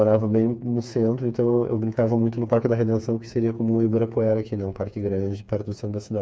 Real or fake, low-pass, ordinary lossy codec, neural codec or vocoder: fake; none; none; codec, 16 kHz, 4 kbps, FreqCodec, smaller model